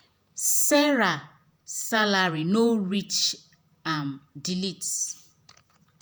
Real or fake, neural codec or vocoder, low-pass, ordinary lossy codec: fake; vocoder, 48 kHz, 128 mel bands, Vocos; none; none